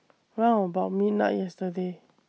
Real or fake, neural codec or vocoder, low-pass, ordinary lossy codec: real; none; none; none